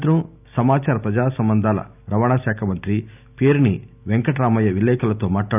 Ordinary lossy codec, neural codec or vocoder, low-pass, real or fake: none; none; 3.6 kHz; real